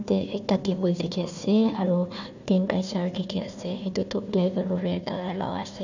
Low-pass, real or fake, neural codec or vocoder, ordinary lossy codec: 7.2 kHz; fake; codec, 16 kHz, 1 kbps, FunCodec, trained on Chinese and English, 50 frames a second; none